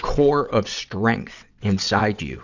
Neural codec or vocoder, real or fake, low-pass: vocoder, 22.05 kHz, 80 mel bands, WaveNeXt; fake; 7.2 kHz